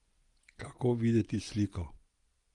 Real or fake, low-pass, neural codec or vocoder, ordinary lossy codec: fake; 10.8 kHz; vocoder, 24 kHz, 100 mel bands, Vocos; Opus, 32 kbps